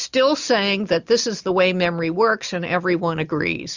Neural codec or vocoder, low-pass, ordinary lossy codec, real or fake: none; 7.2 kHz; Opus, 64 kbps; real